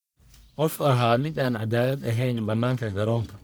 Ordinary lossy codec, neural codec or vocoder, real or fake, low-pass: none; codec, 44.1 kHz, 1.7 kbps, Pupu-Codec; fake; none